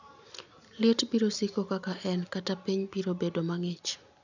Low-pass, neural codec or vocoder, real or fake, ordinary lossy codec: 7.2 kHz; none; real; none